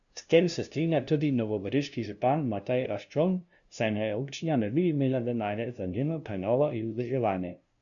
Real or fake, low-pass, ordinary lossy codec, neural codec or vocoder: fake; 7.2 kHz; none; codec, 16 kHz, 0.5 kbps, FunCodec, trained on LibriTTS, 25 frames a second